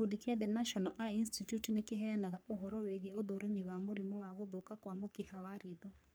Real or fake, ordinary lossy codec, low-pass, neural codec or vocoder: fake; none; none; codec, 44.1 kHz, 3.4 kbps, Pupu-Codec